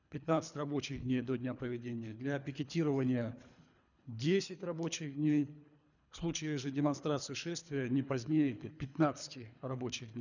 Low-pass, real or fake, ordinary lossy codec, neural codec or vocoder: 7.2 kHz; fake; none; codec, 24 kHz, 3 kbps, HILCodec